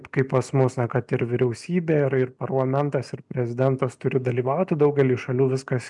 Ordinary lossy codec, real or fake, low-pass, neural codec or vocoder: MP3, 96 kbps; real; 10.8 kHz; none